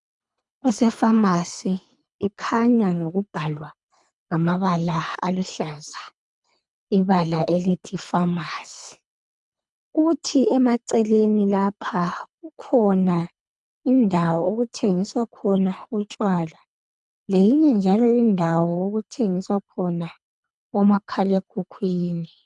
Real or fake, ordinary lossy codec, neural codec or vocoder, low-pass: fake; MP3, 96 kbps; codec, 24 kHz, 3 kbps, HILCodec; 10.8 kHz